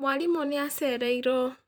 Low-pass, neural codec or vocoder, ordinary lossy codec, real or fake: none; vocoder, 44.1 kHz, 128 mel bands, Pupu-Vocoder; none; fake